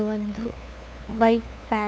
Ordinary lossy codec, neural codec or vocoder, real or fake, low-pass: none; codec, 16 kHz, 2 kbps, FunCodec, trained on LibriTTS, 25 frames a second; fake; none